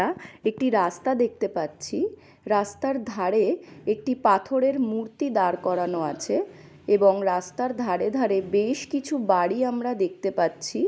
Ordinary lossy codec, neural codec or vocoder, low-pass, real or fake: none; none; none; real